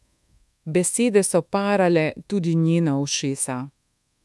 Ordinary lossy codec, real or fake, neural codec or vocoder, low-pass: none; fake; codec, 24 kHz, 1.2 kbps, DualCodec; none